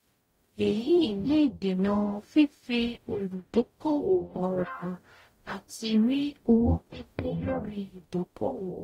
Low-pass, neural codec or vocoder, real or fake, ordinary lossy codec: 19.8 kHz; codec, 44.1 kHz, 0.9 kbps, DAC; fake; AAC, 48 kbps